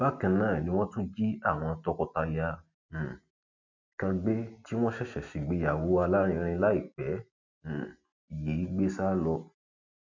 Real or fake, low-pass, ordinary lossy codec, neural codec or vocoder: real; 7.2 kHz; MP3, 64 kbps; none